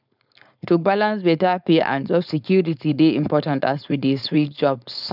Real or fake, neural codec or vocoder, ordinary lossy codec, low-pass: fake; codec, 16 kHz, 4.8 kbps, FACodec; none; 5.4 kHz